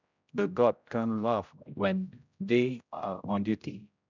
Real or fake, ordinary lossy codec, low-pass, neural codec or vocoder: fake; none; 7.2 kHz; codec, 16 kHz, 0.5 kbps, X-Codec, HuBERT features, trained on general audio